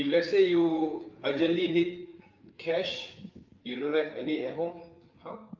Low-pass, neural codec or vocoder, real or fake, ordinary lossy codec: 7.2 kHz; codec, 16 kHz, 4 kbps, FreqCodec, larger model; fake; Opus, 24 kbps